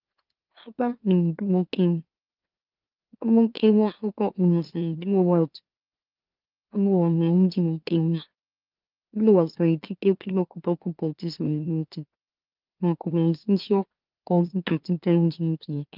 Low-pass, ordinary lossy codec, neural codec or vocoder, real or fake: 5.4 kHz; Opus, 24 kbps; autoencoder, 44.1 kHz, a latent of 192 numbers a frame, MeloTTS; fake